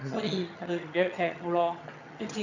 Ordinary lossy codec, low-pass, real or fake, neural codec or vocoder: none; 7.2 kHz; fake; vocoder, 22.05 kHz, 80 mel bands, HiFi-GAN